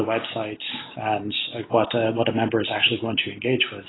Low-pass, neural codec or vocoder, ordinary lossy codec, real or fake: 7.2 kHz; none; AAC, 16 kbps; real